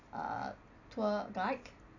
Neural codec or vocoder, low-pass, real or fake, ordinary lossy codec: none; 7.2 kHz; real; none